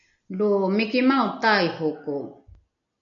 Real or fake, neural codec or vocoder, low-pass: real; none; 7.2 kHz